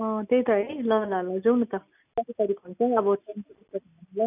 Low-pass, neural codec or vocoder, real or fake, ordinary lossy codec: 3.6 kHz; none; real; none